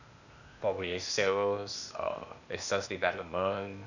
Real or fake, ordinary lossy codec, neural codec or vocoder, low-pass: fake; none; codec, 16 kHz, 0.8 kbps, ZipCodec; 7.2 kHz